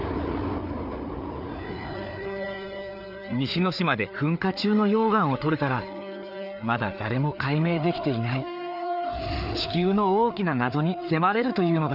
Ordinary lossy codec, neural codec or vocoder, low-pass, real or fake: none; codec, 16 kHz, 4 kbps, FreqCodec, larger model; 5.4 kHz; fake